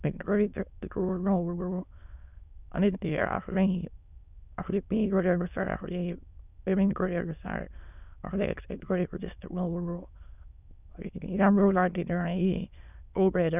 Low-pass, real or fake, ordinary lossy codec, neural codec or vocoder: 3.6 kHz; fake; none; autoencoder, 22.05 kHz, a latent of 192 numbers a frame, VITS, trained on many speakers